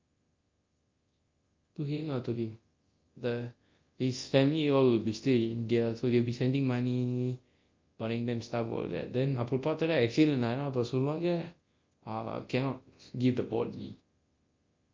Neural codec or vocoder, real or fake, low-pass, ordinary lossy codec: codec, 24 kHz, 0.9 kbps, WavTokenizer, large speech release; fake; 7.2 kHz; Opus, 24 kbps